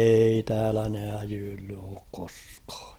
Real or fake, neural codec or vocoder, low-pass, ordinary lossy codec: real; none; 19.8 kHz; Opus, 16 kbps